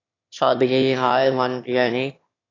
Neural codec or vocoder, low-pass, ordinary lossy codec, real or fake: autoencoder, 22.05 kHz, a latent of 192 numbers a frame, VITS, trained on one speaker; 7.2 kHz; AAC, 32 kbps; fake